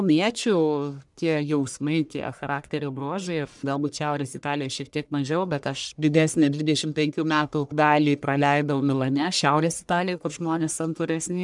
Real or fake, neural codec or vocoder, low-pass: fake; codec, 44.1 kHz, 1.7 kbps, Pupu-Codec; 10.8 kHz